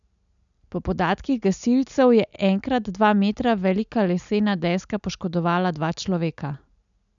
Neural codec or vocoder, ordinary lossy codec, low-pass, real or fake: none; none; 7.2 kHz; real